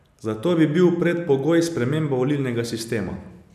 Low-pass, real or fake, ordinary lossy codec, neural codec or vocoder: 14.4 kHz; real; none; none